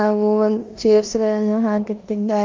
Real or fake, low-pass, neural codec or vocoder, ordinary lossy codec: fake; 7.2 kHz; codec, 16 kHz in and 24 kHz out, 0.9 kbps, LongCat-Audio-Codec, four codebook decoder; Opus, 32 kbps